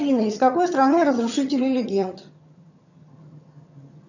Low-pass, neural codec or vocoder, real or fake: 7.2 kHz; vocoder, 22.05 kHz, 80 mel bands, HiFi-GAN; fake